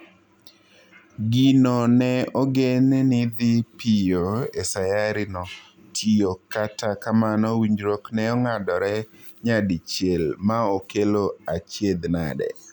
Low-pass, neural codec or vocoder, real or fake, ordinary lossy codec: 19.8 kHz; none; real; none